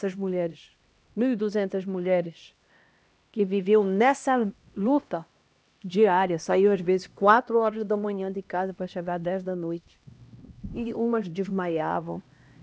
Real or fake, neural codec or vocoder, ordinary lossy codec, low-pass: fake; codec, 16 kHz, 1 kbps, X-Codec, HuBERT features, trained on LibriSpeech; none; none